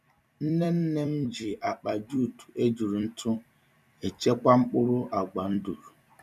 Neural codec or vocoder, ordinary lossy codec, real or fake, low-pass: vocoder, 44.1 kHz, 128 mel bands every 256 samples, BigVGAN v2; none; fake; 14.4 kHz